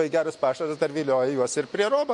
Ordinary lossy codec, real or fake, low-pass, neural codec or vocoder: MP3, 48 kbps; real; 10.8 kHz; none